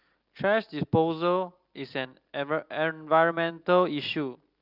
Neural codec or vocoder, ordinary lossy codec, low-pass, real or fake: none; Opus, 24 kbps; 5.4 kHz; real